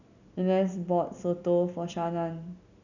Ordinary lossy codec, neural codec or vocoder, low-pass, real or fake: none; none; 7.2 kHz; real